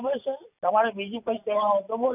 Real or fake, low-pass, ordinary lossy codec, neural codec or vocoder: real; 3.6 kHz; none; none